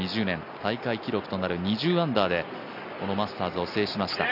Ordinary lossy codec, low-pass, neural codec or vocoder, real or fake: none; 5.4 kHz; none; real